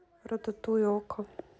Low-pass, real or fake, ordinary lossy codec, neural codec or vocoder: none; real; none; none